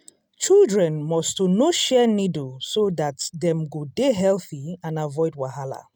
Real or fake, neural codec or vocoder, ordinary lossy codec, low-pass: real; none; none; none